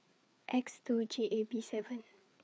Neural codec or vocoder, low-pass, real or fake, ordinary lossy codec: codec, 16 kHz, 4 kbps, FreqCodec, larger model; none; fake; none